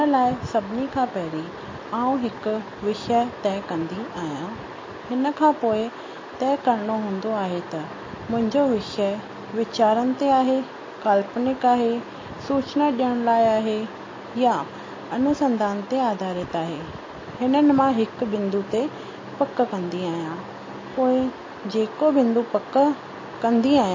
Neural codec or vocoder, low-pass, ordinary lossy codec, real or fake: none; 7.2 kHz; MP3, 32 kbps; real